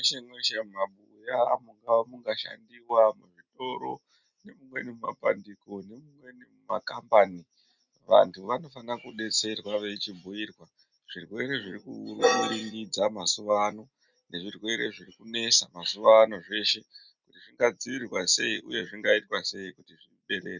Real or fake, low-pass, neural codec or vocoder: real; 7.2 kHz; none